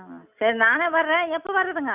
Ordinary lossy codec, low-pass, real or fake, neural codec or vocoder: none; 3.6 kHz; real; none